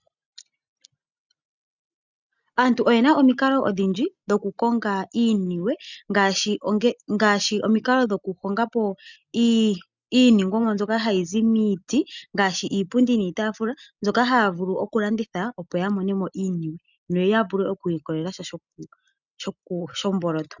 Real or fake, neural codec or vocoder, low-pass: real; none; 7.2 kHz